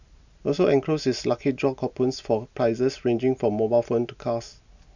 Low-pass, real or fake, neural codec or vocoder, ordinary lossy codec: 7.2 kHz; real; none; none